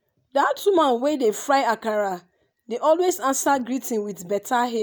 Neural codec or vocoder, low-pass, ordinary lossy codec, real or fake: none; none; none; real